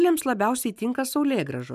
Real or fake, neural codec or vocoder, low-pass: real; none; 14.4 kHz